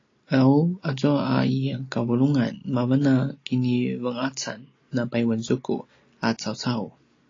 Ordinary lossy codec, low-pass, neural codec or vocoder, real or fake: AAC, 32 kbps; 7.2 kHz; none; real